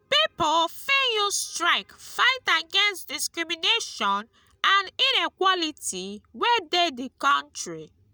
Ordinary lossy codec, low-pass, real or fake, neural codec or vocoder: none; none; real; none